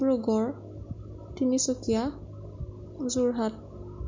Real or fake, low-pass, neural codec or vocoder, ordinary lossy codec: real; 7.2 kHz; none; MP3, 48 kbps